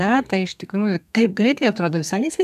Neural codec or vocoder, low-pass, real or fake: codec, 32 kHz, 1.9 kbps, SNAC; 14.4 kHz; fake